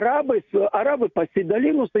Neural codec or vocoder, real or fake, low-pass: none; real; 7.2 kHz